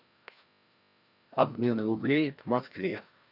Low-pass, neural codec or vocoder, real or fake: 5.4 kHz; codec, 16 kHz, 1 kbps, FreqCodec, larger model; fake